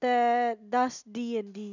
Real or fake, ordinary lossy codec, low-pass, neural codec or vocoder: real; none; 7.2 kHz; none